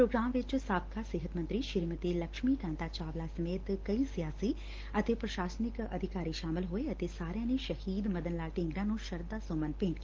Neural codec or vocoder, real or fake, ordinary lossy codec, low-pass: none; real; Opus, 16 kbps; 7.2 kHz